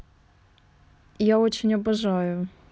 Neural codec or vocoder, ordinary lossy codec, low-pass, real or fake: none; none; none; real